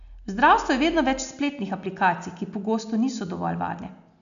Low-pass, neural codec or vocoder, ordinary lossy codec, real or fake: 7.2 kHz; none; none; real